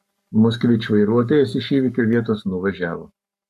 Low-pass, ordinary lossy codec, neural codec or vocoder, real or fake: 14.4 kHz; MP3, 96 kbps; codec, 44.1 kHz, 7.8 kbps, Pupu-Codec; fake